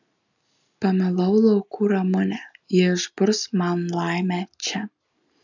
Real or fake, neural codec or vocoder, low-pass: real; none; 7.2 kHz